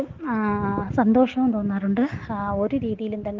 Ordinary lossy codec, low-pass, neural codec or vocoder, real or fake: Opus, 16 kbps; 7.2 kHz; none; real